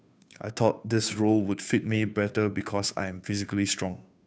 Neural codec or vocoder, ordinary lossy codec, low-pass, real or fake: codec, 16 kHz, 2 kbps, FunCodec, trained on Chinese and English, 25 frames a second; none; none; fake